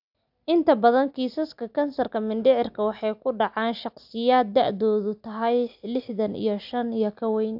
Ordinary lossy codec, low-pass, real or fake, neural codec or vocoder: AAC, 48 kbps; 5.4 kHz; real; none